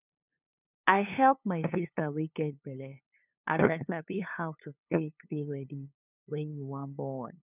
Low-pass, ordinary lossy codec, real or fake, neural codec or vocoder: 3.6 kHz; none; fake; codec, 16 kHz, 2 kbps, FunCodec, trained on LibriTTS, 25 frames a second